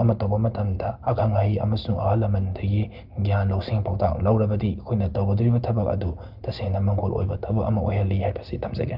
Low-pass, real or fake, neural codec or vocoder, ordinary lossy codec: 5.4 kHz; real; none; Opus, 32 kbps